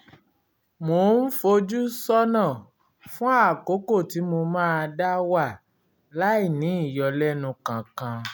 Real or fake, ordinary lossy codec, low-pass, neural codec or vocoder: real; none; none; none